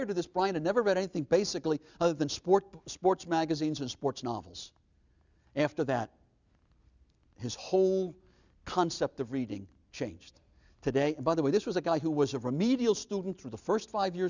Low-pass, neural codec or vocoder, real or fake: 7.2 kHz; none; real